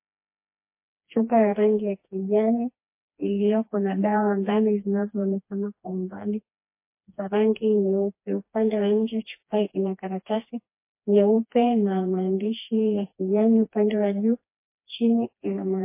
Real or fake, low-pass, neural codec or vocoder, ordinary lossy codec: fake; 3.6 kHz; codec, 16 kHz, 2 kbps, FreqCodec, smaller model; MP3, 24 kbps